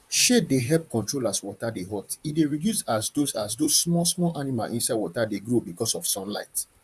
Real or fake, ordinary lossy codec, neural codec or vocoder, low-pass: fake; none; vocoder, 44.1 kHz, 128 mel bands, Pupu-Vocoder; 14.4 kHz